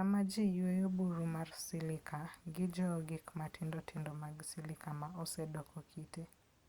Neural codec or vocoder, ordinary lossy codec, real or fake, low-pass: none; Opus, 64 kbps; real; 19.8 kHz